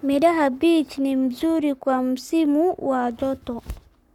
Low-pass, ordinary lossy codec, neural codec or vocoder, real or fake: 19.8 kHz; none; codec, 44.1 kHz, 7.8 kbps, Pupu-Codec; fake